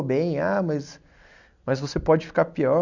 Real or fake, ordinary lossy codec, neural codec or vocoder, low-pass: real; none; none; 7.2 kHz